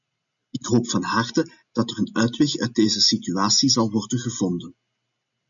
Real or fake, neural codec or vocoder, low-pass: real; none; 7.2 kHz